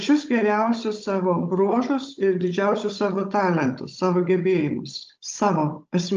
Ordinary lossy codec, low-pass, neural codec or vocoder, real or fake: Opus, 24 kbps; 7.2 kHz; codec, 16 kHz, 16 kbps, FunCodec, trained on Chinese and English, 50 frames a second; fake